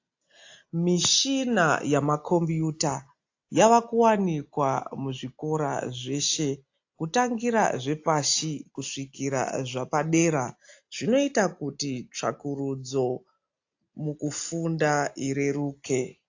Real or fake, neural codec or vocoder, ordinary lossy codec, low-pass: real; none; AAC, 48 kbps; 7.2 kHz